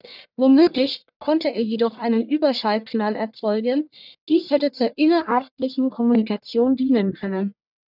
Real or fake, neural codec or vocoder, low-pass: fake; codec, 44.1 kHz, 1.7 kbps, Pupu-Codec; 5.4 kHz